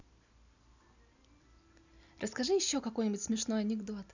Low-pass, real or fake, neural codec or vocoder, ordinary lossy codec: 7.2 kHz; real; none; none